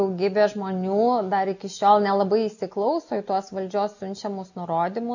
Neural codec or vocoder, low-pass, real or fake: none; 7.2 kHz; real